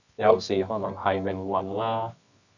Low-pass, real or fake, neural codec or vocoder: 7.2 kHz; fake; codec, 24 kHz, 0.9 kbps, WavTokenizer, medium music audio release